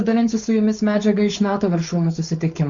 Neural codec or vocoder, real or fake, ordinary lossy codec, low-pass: codec, 16 kHz, 4.8 kbps, FACodec; fake; Opus, 64 kbps; 7.2 kHz